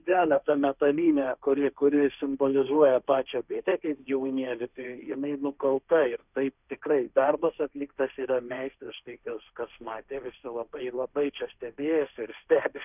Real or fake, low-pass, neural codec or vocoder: fake; 3.6 kHz; codec, 16 kHz, 1.1 kbps, Voila-Tokenizer